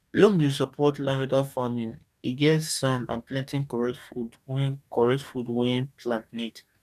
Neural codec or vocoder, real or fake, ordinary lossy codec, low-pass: codec, 44.1 kHz, 2.6 kbps, DAC; fake; none; 14.4 kHz